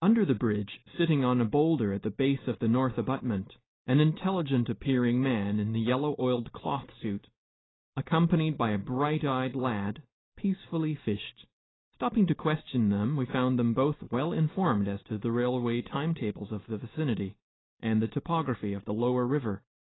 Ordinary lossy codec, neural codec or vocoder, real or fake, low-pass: AAC, 16 kbps; none; real; 7.2 kHz